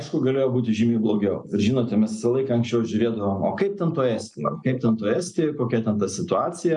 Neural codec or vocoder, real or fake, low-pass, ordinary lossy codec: none; real; 10.8 kHz; MP3, 96 kbps